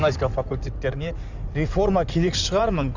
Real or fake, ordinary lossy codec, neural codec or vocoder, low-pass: fake; none; codec, 16 kHz in and 24 kHz out, 2.2 kbps, FireRedTTS-2 codec; 7.2 kHz